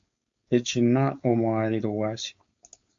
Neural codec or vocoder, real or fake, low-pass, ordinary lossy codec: codec, 16 kHz, 4.8 kbps, FACodec; fake; 7.2 kHz; MP3, 64 kbps